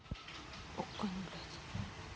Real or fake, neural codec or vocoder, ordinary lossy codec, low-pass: real; none; none; none